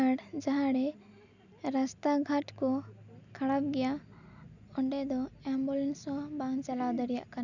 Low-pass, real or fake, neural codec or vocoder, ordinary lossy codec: 7.2 kHz; real; none; none